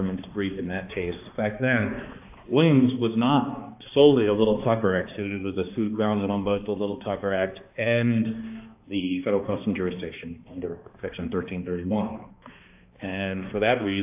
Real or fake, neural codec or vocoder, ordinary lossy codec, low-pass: fake; codec, 16 kHz, 2 kbps, X-Codec, HuBERT features, trained on balanced general audio; AAC, 32 kbps; 3.6 kHz